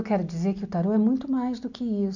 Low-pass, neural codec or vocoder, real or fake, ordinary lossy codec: 7.2 kHz; none; real; none